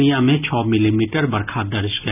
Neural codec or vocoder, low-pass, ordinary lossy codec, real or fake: none; 3.6 kHz; none; real